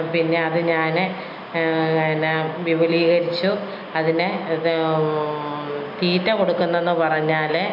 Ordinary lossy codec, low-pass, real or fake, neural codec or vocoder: MP3, 48 kbps; 5.4 kHz; real; none